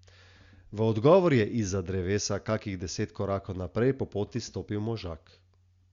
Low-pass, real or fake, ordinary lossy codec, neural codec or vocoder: 7.2 kHz; real; Opus, 64 kbps; none